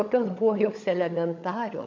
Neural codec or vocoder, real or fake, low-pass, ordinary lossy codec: codec, 16 kHz, 16 kbps, FunCodec, trained on LibriTTS, 50 frames a second; fake; 7.2 kHz; MP3, 64 kbps